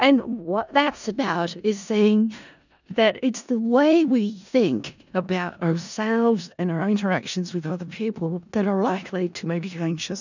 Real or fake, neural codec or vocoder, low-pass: fake; codec, 16 kHz in and 24 kHz out, 0.4 kbps, LongCat-Audio-Codec, four codebook decoder; 7.2 kHz